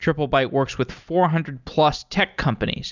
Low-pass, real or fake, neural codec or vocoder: 7.2 kHz; real; none